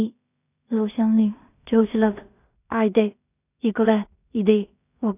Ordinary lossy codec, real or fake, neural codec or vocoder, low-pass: none; fake; codec, 16 kHz in and 24 kHz out, 0.4 kbps, LongCat-Audio-Codec, two codebook decoder; 3.6 kHz